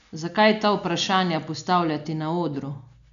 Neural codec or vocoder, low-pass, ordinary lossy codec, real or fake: none; 7.2 kHz; none; real